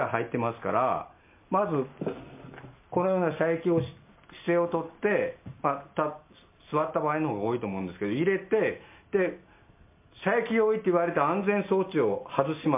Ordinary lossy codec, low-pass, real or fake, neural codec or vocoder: MP3, 24 kbps; 3.6 kHz; real; none